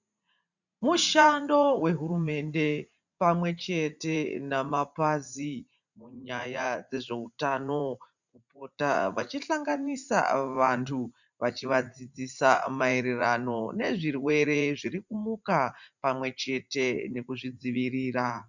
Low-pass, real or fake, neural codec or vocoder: 7.2 kHz; fake; vocoder, 44.1 kHz, 80 mel bands, Vocos